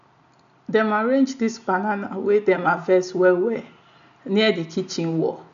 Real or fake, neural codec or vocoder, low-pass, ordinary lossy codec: real; none; 7.2 kHz; none